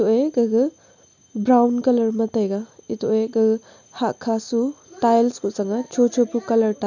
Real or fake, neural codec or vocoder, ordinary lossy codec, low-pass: real; none; none; 7.2 kHz